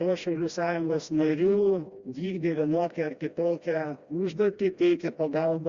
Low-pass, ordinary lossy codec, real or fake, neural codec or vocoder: 7.2 kHz; Opus, 64 kbps; fake; codec, 16 kHz, 1 kbps, FreqCodec, smaller model